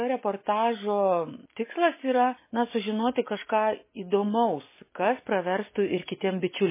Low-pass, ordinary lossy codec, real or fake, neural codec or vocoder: 3.6 kHz; MP3, 16 kbps; real; none